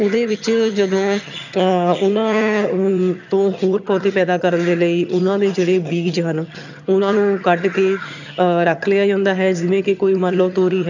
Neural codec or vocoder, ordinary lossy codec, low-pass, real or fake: vocoder, 22.05 kHz, 80 mel bands, HiFi-GAN; none; 7.2 kHz; fake